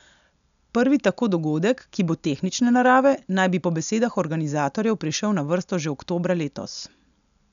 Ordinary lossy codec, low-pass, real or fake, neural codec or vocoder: none; 7.2 kHz; real; none